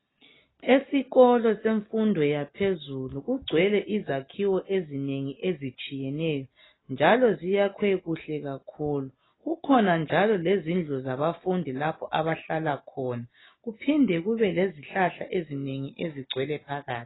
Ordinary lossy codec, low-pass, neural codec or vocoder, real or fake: AAC, 16 kbps; 7.2 kHz; none; real